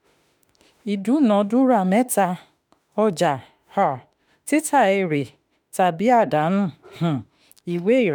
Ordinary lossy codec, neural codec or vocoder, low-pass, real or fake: none; autoencoder, 48 kHz, 32 numbers a frame, DAC-VAE, trained on Japanese speech; 19.8 kHz; fake